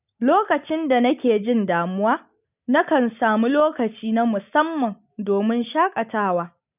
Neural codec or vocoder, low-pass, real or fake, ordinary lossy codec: none; 3.6 kHz; real; none